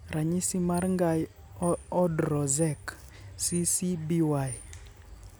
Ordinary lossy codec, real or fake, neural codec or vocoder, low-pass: none; real; none; none